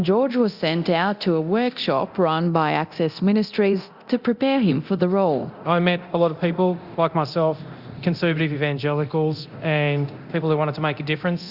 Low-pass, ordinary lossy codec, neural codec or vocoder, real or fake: 5.4 kHz; Opus, 64 kbps; codec, 24 kHz, 0.9 kbps, DualCodec; fake